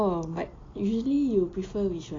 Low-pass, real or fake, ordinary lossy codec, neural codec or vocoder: 7.2 kHz; real; AAC, 32 kbps; none